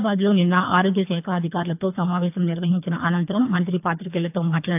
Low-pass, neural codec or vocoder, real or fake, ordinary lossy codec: 3.6 kHz; codec, 24 kHz, 3 kbps, HILCodec; fake; AAC, 32 kbps